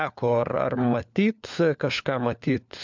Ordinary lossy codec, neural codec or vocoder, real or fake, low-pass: MP3, 64 kbps; codec, 16 kHz, 4 kbps, FunCodec, trained on LibriTTS, 50 frames a second; fake; 7.2 kHz